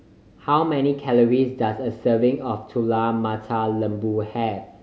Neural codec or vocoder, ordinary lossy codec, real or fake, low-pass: none; none; real; none